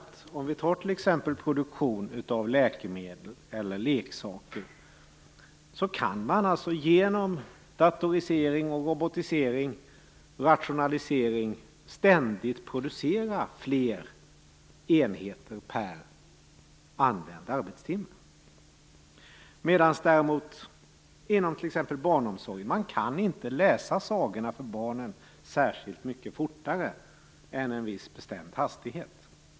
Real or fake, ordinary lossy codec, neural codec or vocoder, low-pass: real; none; none; none